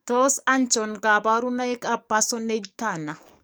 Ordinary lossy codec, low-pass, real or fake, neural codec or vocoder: none; none; fake; codec, 44.1 kHz, 7.8 kbps, DAC